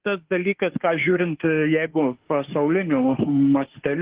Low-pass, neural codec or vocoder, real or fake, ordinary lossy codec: 3.6 kHz; autoencoder, 48 kHz, 32 numbers a frame, DAC-VAE, trained on Japanese speech; fake; Opus, 16 kbps